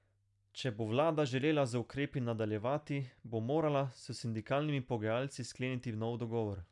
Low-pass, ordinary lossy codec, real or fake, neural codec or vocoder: 10.8 kHz; none; real; none